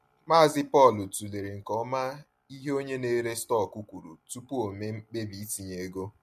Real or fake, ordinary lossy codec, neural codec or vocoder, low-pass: real; MP3, 64 kbps; none; 14.4 kHz